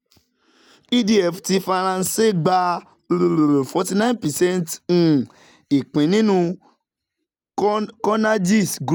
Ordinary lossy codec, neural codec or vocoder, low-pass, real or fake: none; none; 19.8 kHz; real